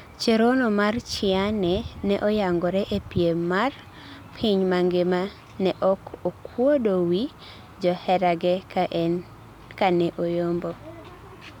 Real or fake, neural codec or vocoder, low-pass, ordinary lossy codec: real; none; 19.8 kHz; none